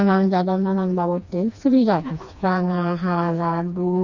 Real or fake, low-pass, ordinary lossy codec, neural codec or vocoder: fake; 7.2 kHz; Opus, 64 kbps; codec, 16 kHz, 2 kbps, FreqCodec, smaller model